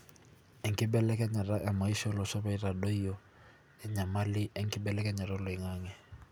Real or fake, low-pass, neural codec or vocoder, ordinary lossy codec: real; none; none; none